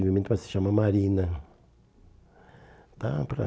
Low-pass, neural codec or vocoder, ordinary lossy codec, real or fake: none; none; none; real